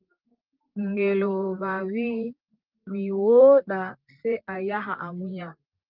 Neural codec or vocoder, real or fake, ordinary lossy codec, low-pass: vocoder, 44.1 kHz, 128 mel bands, Pupu-Vocoder; fake; Opus, 32 kbps; 5.4 kHz